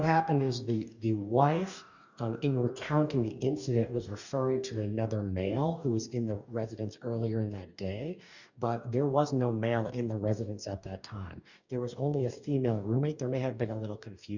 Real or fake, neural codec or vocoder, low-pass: fake; codec, 44.1 kHz, 2.6 kbps, DAC; 7.2 kHz